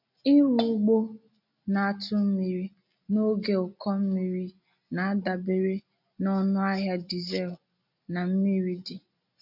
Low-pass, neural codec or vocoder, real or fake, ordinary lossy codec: 5.4 kHz; none; real; none